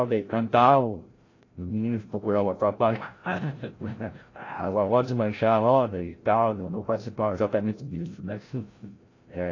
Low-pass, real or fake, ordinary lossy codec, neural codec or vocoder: 7.2 kHz; fake; AAC, 32 kbps; codec, 16 kHz, 0.5 kbps, FreqCodec, larger model